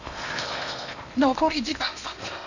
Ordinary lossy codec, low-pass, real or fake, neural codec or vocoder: none; 7.2 kHz; fake; codec, 16 kHz in and 24 kHz out, 0.8 kbps, FocalCodec, streaming, 65536 codes